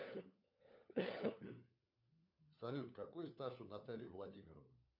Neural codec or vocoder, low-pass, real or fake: codec, 16 kHz, 4 kbps, FunCodec, trained on LibriTTS, 50 frames a second; 5.4 kHz; fake